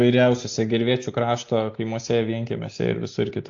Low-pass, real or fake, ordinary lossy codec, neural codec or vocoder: 7.2 kHz; real; AAC, 48 kbps; none